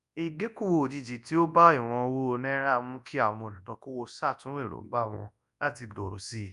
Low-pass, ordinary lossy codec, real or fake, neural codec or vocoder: 10.8 kHz; none; fake; codec, 24 kHz, 0.9 kbps, WavTokenizer, large speech release